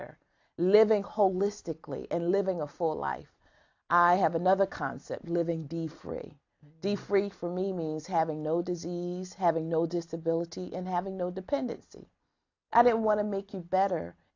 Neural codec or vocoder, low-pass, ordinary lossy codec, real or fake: none; 7.2 kHz; AAC, 48 kbps; real